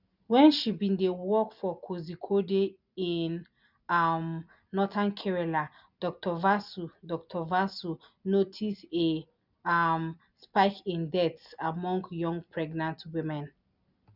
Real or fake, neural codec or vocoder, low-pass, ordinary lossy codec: real; none; 5.4 kHz; none